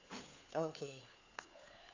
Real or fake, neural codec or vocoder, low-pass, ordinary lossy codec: fake; codec, 16 kHz, 4 kbps, FunCodec, trained on LibriTTS, 50 frames a second; 7.2 kHz; AAC, 48 kbps